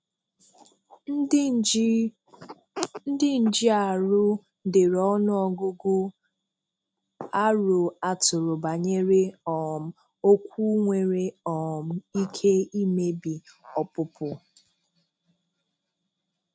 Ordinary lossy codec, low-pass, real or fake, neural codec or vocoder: none; none; real; none